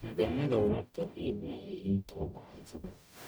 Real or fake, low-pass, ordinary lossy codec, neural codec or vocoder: fake; none; none; codec, 44.1 kHz, 0.9 kbps, DAC